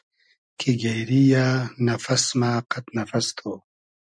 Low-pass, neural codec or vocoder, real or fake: 9.9 kHz; none; real